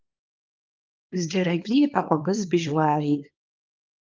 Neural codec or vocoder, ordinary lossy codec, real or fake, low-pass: codec, 24 kHz, 0.9 kbps, WavTokenizer, small release; Opus, 24 kbps; fake; 7.2 kHz